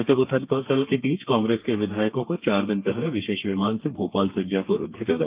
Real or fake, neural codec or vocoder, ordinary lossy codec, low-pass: fake; codec, 32 kHz, 1.9 kbps, SNAC; Opus, 32 kbps; 3.6 kHz